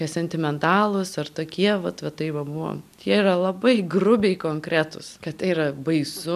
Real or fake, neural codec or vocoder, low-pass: real; none; 14.4 kHz